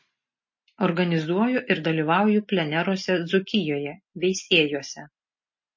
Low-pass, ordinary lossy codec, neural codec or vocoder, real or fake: 7.2 kHz; MP3, 32 kbps; none; real